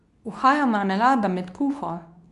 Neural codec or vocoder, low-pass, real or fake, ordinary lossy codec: codec, 24 kHz, 0.9 kbps, WavTokenizer, medium speech release version 2; 10.8 kHz; fake; none